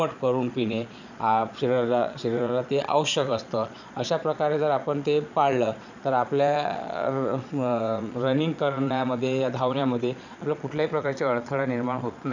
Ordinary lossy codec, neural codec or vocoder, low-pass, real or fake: none; vocoder, 22.05 kHz, 80 mel bands, Vocos; 7.2 kHz; fake